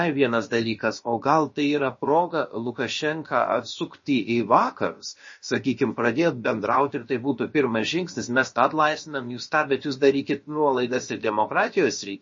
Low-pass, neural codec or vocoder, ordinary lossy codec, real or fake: 7.2 kHz; codec, 16 kHz, about 1 kbps, DyCAST, with the encoder's durations; MP3, 32 kbps; fake